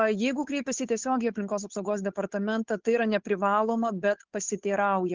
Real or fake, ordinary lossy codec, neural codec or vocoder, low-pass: fake; Opus, 16 kbps; codec, 16 kHz, 8 kbps, FunCodec, trained on Chinese and English, 25 frames a second; 7.2 kHz